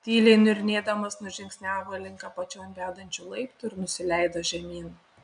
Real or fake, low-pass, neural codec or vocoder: real; 9.9 kHz; none